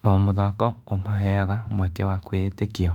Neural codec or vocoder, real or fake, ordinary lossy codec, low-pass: autoencoder, 48 kHz, 32 numbers a frame, DAC-VAE, trained on Japanese speech; fake; none; 19.8 kHz